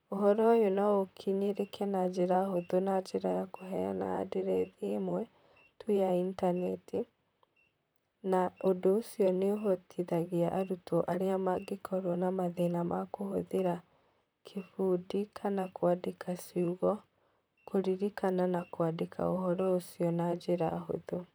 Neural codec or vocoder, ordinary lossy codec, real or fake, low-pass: vocoder, 44.1 kHz, 128 mel bands, Pupu-Vocoder; none; fake; none